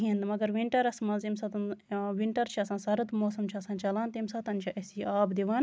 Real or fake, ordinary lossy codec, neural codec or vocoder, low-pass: real; none; none; none